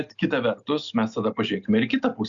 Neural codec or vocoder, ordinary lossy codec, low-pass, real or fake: none; Opus, 64 kbps; 7.2 kHz; real